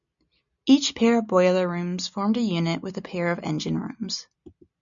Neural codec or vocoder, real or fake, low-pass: none; real; 7.2 kHz